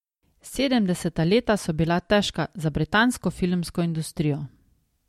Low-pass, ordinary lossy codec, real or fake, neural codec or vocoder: 19.8 kHz; MP3, 64 kbps; real; none